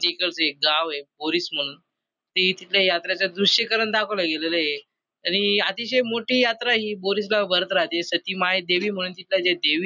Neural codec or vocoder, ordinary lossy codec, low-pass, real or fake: none; none; 7.2 kHz; real